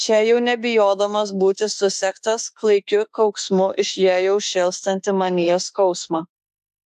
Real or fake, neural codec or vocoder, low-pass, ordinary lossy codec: fake; autoencoder, 48 kHz, 32 numbers a frame, DAC-VAE, trained on Japanese speech; 14.4 kHz; AAC, 96 kbps